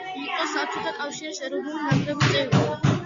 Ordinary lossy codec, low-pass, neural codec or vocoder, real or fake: MP3, 64 kbps; 7.2 kHz; none; real